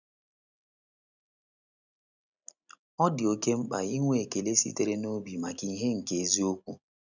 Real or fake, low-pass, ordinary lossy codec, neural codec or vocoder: real; 7.2 kHz; none; none